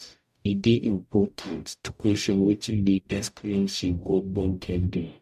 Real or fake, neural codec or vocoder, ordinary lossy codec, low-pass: fake; codec, 44.1 kHz, 0.9 kbps, DAC; MP3, 64 kbps; 14.4 kHz